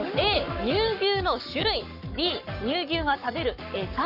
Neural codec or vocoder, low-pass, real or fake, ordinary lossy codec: codec, 44.1 kHz, 7.8 kbps, Pupu-Codec; 5.4 kHz; fake; none